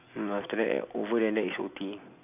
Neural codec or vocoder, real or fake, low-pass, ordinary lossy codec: none; real; 3.6 kHz; AAC, 32 kbps